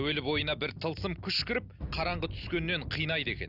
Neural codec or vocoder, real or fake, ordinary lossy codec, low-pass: none; real; Opus, 64 kbps; 5.4 kHz